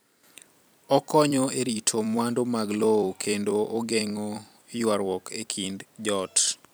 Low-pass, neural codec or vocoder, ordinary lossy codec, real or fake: none; vocoder, 44.1 kHz, 128 mel bands every 256 samples, BigVGAN v2; none; fake